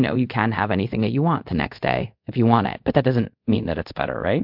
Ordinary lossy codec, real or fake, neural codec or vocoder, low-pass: MP3, 48 kbps; fake; codec, 24 kHz, 0.5 kbps, DualCodec; 5.4 kHz